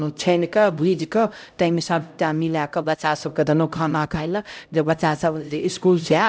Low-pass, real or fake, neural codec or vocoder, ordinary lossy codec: none; fake; codec, 16 kHz, 0.5 kbps, X-Codec, HuBERT features, trained on LibriSpeech; none